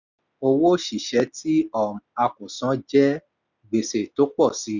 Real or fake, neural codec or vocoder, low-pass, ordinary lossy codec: real; none; 7.2 kHz; none